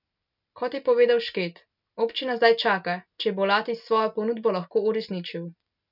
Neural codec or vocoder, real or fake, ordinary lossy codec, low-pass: none; real; none; 5.4 kHz